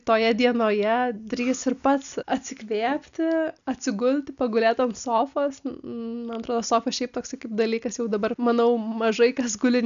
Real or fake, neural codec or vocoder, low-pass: real; none; 7.2 kHz